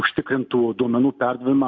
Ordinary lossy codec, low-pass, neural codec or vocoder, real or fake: AAC, 48 kbps; 7.2 kHz; none; real